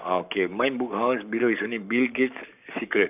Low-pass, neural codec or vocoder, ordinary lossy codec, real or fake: 3.6 kHz; codec, 44.1 kHz, 7.8 kbps, Pupu-Codec; none; fake